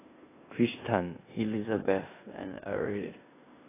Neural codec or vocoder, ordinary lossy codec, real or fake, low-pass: codec, 16 kHz in and 24 kHz out, 0.9 kbps, LongCat-Audio-Codec, four codebook decoder; AAC, 16 kbps; fake; 3.6 kHz